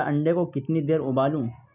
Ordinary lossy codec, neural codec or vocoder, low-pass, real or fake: none; none; 3.6 kHz; real